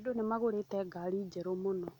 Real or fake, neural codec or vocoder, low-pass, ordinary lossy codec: real; none; 19.8 kHz; none